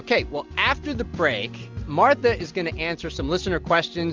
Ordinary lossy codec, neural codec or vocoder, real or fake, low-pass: Opus, 24 kbps; none; real; 7.2 kHz